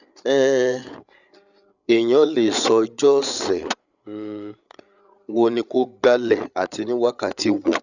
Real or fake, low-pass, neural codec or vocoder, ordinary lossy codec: fake; 7.2 kHz; codec, 16 kHz, 8 kbps, FreqCodec, larger model; none